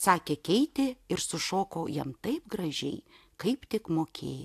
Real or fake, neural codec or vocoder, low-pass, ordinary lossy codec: real; none; 14.4 kHz; AAC, 64 kbps